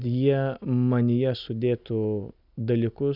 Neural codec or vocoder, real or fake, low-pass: none; real; 5.4 kHz